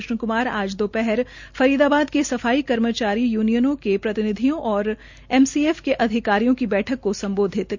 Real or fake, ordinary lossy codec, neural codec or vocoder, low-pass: real; Opus, 64 kbps; none; 7.2 kHz